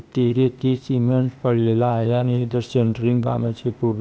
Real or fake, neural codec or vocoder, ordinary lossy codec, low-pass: fake; codec, 16 kHz, 0.8 kbps, ZipCodec; none; none